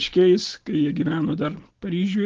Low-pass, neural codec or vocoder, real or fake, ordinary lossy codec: 7.2 kHz; none; real; Opus, 16 kbps